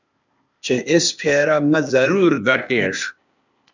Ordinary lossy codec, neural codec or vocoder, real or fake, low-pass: MP3, 64 kbps; codec, 16 kHz, 0.8 kbps, ZipCodec; fake; 7.2 kHz